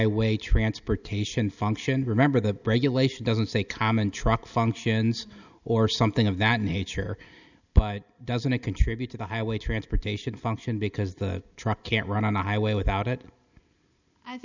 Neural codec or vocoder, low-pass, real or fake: none; 7.2 kHz; real